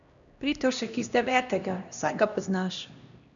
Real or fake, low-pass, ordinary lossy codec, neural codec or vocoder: fake; 7.2 kHz; none; codec, 16 kHz, 1 kbps, X-Codec, HuBERT features, trained on LibriSpeech